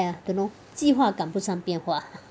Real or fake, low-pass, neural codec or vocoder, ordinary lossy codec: real; none; none; none